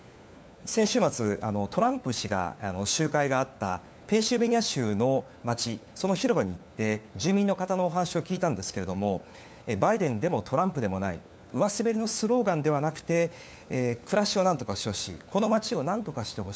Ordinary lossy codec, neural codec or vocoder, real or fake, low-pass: none; codec, 16 kHz, 4 kbps, FunCodec, trained on LibriTTS, 50 frames a second; fake; none